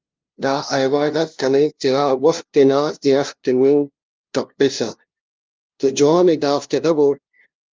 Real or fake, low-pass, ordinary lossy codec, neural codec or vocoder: fake; 7.2 kHz; Opus, 32 kbps; codec, 16 kHz, 0.5 kbps, FunCodec, trained on LibriTTS, 25 frames a second